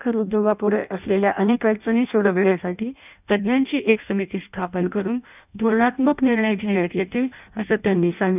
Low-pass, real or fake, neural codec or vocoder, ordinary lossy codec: 3.6 kHz; fake; codec, 16 kHz in and 24 kHz out, 0.6 kbps, FireRedTTS-2 codec; none